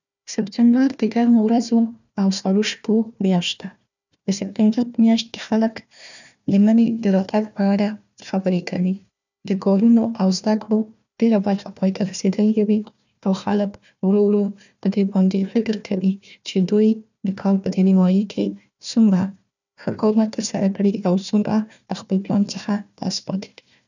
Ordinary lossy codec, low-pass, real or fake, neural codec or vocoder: none; 7.2 kHz; fake; codec, 16 kHz, 1 kbps, FunCodec, trained on Chinese and English, 50 frames a second